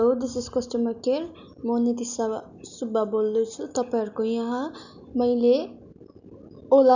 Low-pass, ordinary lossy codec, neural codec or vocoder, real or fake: 7.2 kHz; none; none; real